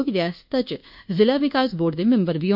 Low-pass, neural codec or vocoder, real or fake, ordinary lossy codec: 5.4 kHz; codec, 24 kHz, 1.2 kbps, DualCodec; fake; none